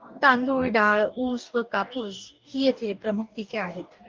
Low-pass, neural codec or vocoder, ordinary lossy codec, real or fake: 7.2 kHz; codec, 44.1 kHz, 2.6 kbps, DAC; Opus, 24 kbps; fake